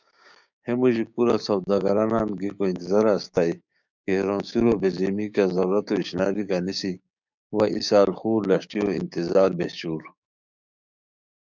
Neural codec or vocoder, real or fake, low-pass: codec, 16 kHz, 6 kbps, DAC; fake; 7.2 kHz